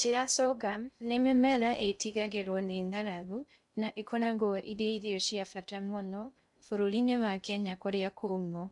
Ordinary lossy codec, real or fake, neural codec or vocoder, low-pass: none; fake; codec, 16 kHz in and 24 kHz out, 0.6 kbps, FocalCodec, streaming, 2048 codes; 10.8 kHz